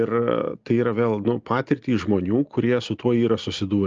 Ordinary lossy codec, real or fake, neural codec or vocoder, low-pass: Opus, 24 kbps; real; none; 7.2 kHz